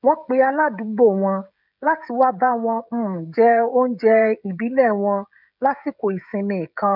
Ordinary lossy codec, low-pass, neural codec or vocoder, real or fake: none; 5.4 kHz; codec, 16 kHz, 16 kbps, FreqCodec, smaller model; fake